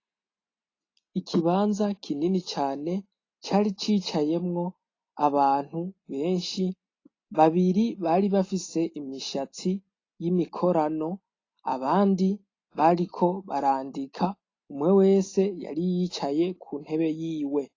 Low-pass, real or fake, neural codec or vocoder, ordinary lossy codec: 7.2 kHz; real; none; AAC, 32 kbps